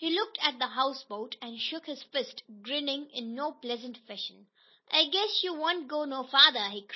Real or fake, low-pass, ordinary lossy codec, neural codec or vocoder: real; 7.2 kHz; MP3, 24 kbps; none